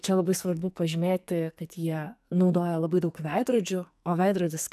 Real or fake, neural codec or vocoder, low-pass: fake; codec, 44.1 kHz, 2.6 kbps, SNAC; 14.4 kHz